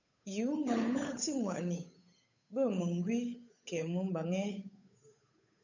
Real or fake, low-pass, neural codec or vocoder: fake; 7.2 kHz; codec, 16 kHz, 8 kbps, FunCodec, trained on Chinese and English, 25 frames a second